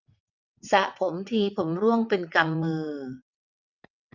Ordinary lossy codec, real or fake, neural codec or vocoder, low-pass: none; fake; vocoder, 22.05 kHz, 80 mel bands, WaveNeXt; 7.2 kHz